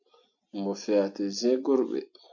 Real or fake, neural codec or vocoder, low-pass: real; none; 7.2 kHz